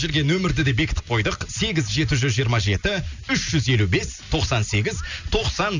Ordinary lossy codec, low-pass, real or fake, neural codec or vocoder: none; 7.2 kHz; real; none